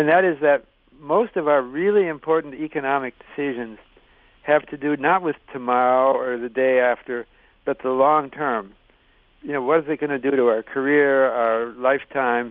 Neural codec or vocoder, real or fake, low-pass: none; real; 5.4 kHz